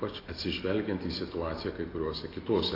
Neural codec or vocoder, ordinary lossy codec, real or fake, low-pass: none; AAC, 24 kbps; real; 5.4 kHz